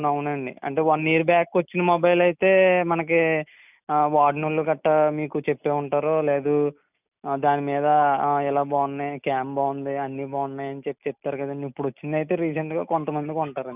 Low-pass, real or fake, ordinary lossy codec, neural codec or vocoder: 3.6 kHz; real; none; none